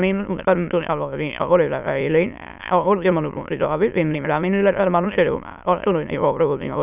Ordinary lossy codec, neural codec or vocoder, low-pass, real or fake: none; autoencoder, 22.05 kHz, a latent of 192 numbers a frame, VITS, trained on many speakers; 3.6 kHz; fake